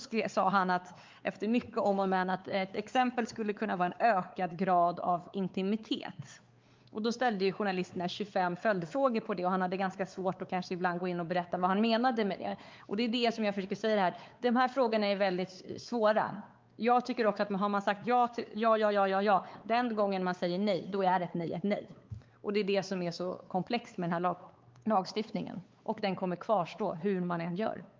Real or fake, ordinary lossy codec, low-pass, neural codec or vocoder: fake; Opus, 24 kbps; 7.2 kHz; codec, 16 kHz, 4 kbps, X-Codec, WavLM features, trained on Multilingual LibriSpeech